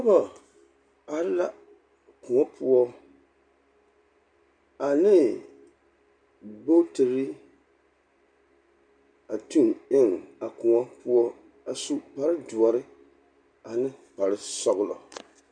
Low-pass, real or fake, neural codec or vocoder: 9.9 kHz; real; none